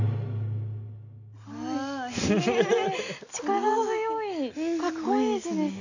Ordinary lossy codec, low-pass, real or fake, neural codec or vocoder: none; 7.2 kHz; real; none